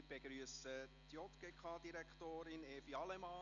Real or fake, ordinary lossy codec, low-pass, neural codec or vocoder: real; none; 7.2 kHz; none